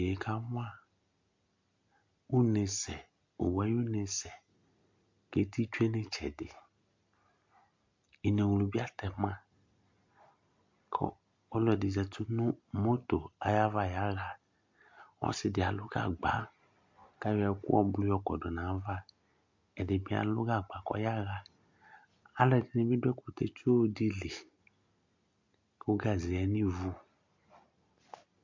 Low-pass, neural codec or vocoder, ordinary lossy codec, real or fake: 7.2 kHz; none; MP3, 48 kbps; real